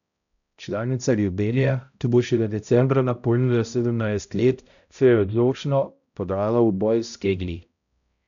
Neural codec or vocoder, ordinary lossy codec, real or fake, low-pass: codec, 16 kHz, 0.5 kbps, X-Codec, HuBERT features, trained on balanced general audio; none; fake; 7.2 kHz